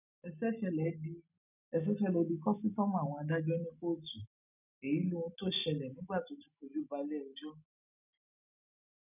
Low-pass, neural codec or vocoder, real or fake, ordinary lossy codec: 3.6 kHz; none; real; none